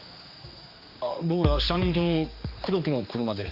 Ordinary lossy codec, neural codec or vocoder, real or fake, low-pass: Opus, 64 kbps; codec, 16 kHz, 2 kbps, X-Codec, HuBERT features, trained on general audio; fake; 5.4 kHz